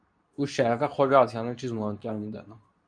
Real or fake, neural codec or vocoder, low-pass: fake; codec, 24 kHz, 0.9 kbps, WavTokenizer, medium speech release version 2; 9.9 kHz